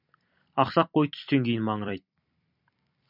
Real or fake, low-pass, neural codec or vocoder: fake; 5.4 kHz; vocoder, 44.1 kHz, 128 mel bands every 256 samples, BigVGAN v2